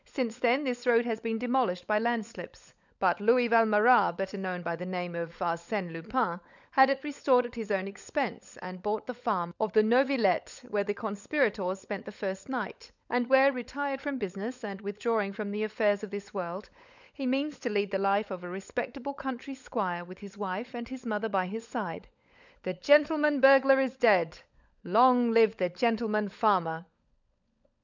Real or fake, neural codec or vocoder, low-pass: fake; codec, 16 kHz, 16 kbps, FunCodec, trained on LibriTTS, 50 frames a second; 7.2 kHz